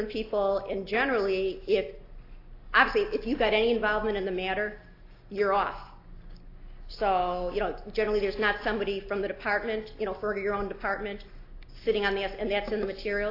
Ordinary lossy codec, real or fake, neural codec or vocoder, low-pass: AAC, 32 kbps; real; none; 5.4 kHz